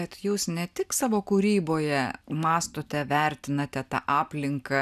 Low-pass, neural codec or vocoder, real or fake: 14.4 kHz; none; real